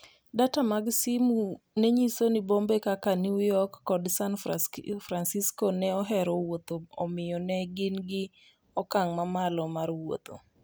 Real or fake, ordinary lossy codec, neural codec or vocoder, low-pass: real; none; none; none